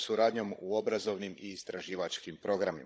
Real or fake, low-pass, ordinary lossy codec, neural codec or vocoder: fake; none; none; codec, 16 kHz, 16 kbps, FunCodec, trained on Chinese and English, 50 frames a second